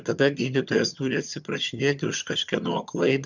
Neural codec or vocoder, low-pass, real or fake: vocoder, 22.05 kHz, 80 mel bands, HiFi-GAN; 7.2 kHz; fake